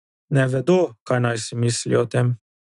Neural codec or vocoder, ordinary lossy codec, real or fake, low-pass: none; none; real; 10.8 kHz